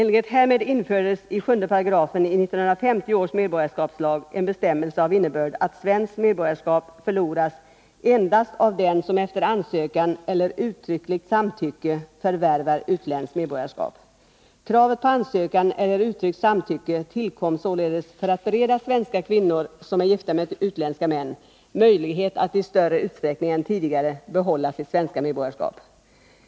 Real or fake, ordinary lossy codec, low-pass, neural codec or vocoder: real; none; none; none